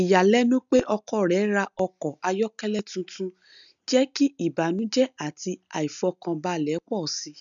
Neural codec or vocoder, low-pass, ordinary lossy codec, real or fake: none; 7.2 kHz; none; real